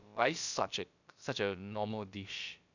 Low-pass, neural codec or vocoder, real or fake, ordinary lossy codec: 7.2 kHz; codec, 16 kHz, about 1 kbps, DyCAST, with the encoder's durations; fake; none